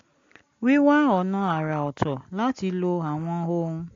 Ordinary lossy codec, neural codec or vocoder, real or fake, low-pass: AAC, 48 kbps; none; real; 7.2 kHz